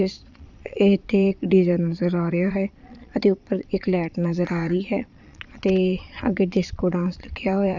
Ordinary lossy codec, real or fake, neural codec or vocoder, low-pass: none; fake; vocoder, 22.05 kHz, 80 mel bands, Vocos; 7.2 kHz